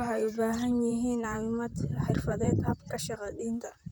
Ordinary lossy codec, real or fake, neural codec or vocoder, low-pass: none; fake; vocoder, 44.1 kHz, 128 mel bands, Pupu-Vocoder; none